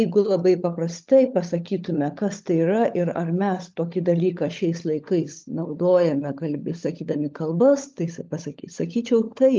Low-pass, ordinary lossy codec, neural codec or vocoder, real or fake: 7.2 kHz; Opus, 24 kbps; codec, 16 kHz, 16 kbps, FunCodec, trained on LibriTTS, 50 frames a second; fake